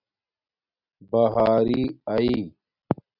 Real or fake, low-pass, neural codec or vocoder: real; 5.4 kHz; none